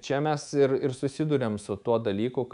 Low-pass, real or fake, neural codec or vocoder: 10.8 kHz; fake; codec, 24 kHz, 3.1 kbps, DualCodec